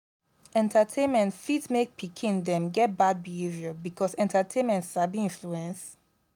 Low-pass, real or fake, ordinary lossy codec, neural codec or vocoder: 19.8 kHz; real; none; none